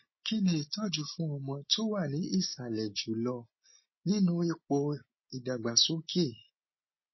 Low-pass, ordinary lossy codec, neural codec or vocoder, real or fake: 7.2 kHz; MP3, 24 kbps; none; real